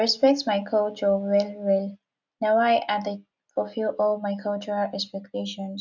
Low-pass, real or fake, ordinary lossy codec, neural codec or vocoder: 7.2 kHz; real; none; none